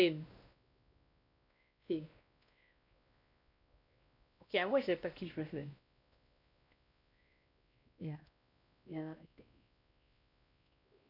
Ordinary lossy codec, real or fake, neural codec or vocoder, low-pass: none; fake; codec, 16 kHz, 1 kbps, X-Codec, WavLM features, trained on Multilingual LibriSpeech; 5.4 kHz